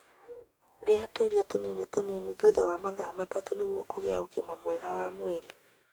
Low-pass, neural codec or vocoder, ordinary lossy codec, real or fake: none; codec, 44.1 kHz, 2.6 kbps, DAC; none; fake